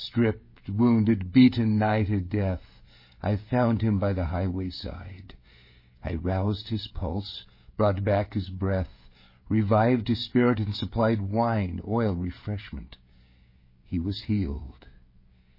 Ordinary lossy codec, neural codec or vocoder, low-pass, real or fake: MP3, 24 kbps; codec, 16 kHz, 16 kbps, FreqCodec, smaller model; 5.4 kHz; fake